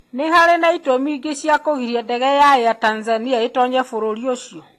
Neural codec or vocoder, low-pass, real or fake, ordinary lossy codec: none; 14.4 kHz; real; AAC, 48 kbps